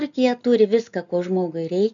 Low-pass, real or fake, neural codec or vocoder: 7.2 kHz; real; none